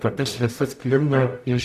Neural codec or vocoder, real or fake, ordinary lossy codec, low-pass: codec, 44.1 kHz, 0.9 kbps, DAC; fake; MP3, 96 kbps; 14.4 kHz